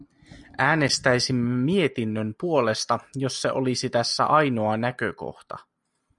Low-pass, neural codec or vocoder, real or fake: 10.8 kHz; none; real